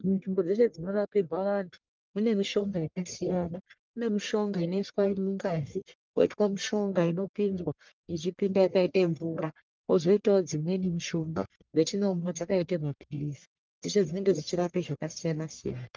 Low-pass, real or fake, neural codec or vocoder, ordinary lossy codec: 7.2 kHz; fake; codec, 44.1 kHz, 1.7 kbps, Pupu-Codec; Opus, 32 kbps